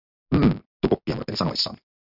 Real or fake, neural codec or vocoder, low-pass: real; none; 5.4 kHz